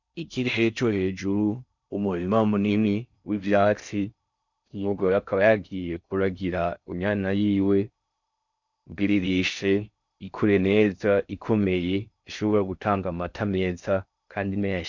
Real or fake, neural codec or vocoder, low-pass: fake; codec, 16 kHz in and 24 kHz out, 0.6 kbps, FocalCodec, streaming, 4096 codes; 7.2 kHz